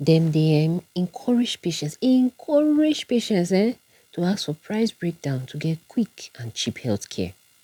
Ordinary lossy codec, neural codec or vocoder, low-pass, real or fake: none; none; 19.8 kHz; real